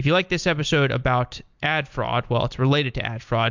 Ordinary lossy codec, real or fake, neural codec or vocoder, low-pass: MP3, 64 kbps; real; none; 7.2 kHz